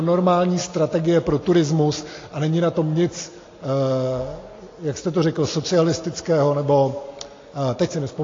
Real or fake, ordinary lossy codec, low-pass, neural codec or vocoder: real; AAC, 32 kbps; 7.2 kHz; none